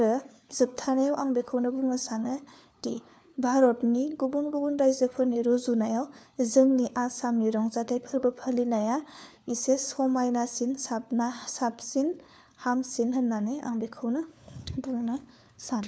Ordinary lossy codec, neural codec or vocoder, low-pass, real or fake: none; codec, 16 kHz, 4 kbps, FunCodec, trained on LibriTTS, 50 frames a second; none; fake